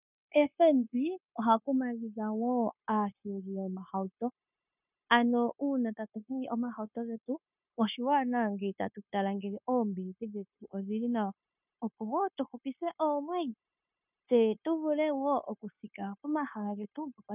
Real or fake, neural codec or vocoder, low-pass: fake; codec, 16 kHz, 0.9 kbps, LongCat-Audio-Codec; 3.6 kHz